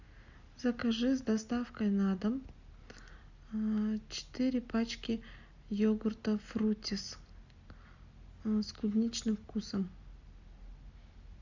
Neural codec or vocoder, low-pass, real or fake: none; 7.2 kHz; real